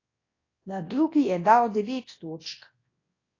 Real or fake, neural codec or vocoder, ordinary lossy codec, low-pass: fake; codec, 24 kHz, 0.9 kbps, WavTokenizer, large speech release; AAC, 32 kbps; 7.2 kHz